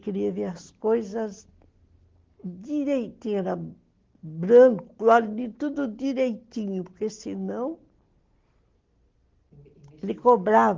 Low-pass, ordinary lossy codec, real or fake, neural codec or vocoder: 7.2 kHz; Opus, 16 kbps; real; none